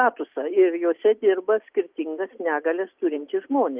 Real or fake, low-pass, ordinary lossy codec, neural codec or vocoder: real; 3.6 kHz; Opus, 32 kbps; none